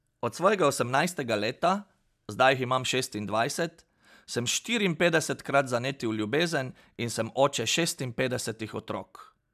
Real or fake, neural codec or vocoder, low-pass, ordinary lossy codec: real; none; 14.4 kHz; none